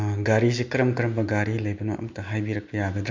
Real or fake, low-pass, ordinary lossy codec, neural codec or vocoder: real; 7.2 kHz; MP3, 48 kbps; none